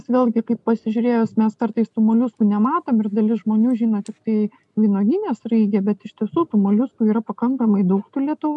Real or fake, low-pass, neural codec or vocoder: real; 10.8 kHz; none